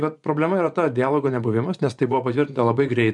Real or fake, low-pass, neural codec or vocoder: fake; 10.8 kHz; vocoder, 24 kHz, 100 mel bands, Vocos